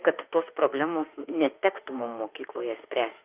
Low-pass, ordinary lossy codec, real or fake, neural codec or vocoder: 3.6 kHz; Opus, 24 kbps; fake; autoencoder, 48 kHz, 32 numbers a frame, DAC-VAE, trained on Japanese speech